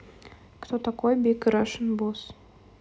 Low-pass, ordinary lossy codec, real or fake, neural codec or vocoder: none; none; real; none